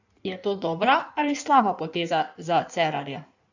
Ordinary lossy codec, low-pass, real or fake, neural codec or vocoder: none; 7.2 kHz; fake; codec, 16 kHz in and 24 kHz out, 1.1 kbps, FireRedTTS-2 codec